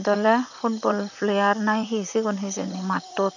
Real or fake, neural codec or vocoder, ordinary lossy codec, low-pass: fake; vocoder, 44.1 kHz, 128 mel bands, Pupu-Vocoder; none; 7.2 kHz